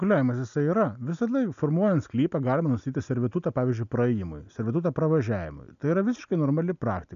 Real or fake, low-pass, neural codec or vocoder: real; 7.2 kHz; none